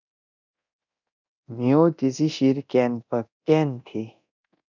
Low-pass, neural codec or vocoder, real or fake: 7.2 kHz; codec, 24 kHz, 0.9 kbps, DualCodec; fake